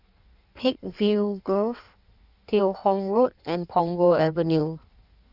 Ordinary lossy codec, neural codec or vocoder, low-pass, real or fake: none; codec, 16 kHz in and 24 kHz out, 1.1 kbps, FireRedTTS-2 codec; 5.4 kHz; fake